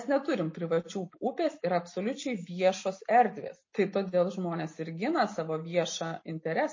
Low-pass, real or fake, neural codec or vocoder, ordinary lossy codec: 7.2 kHz; real; none; MP3, 32 kbps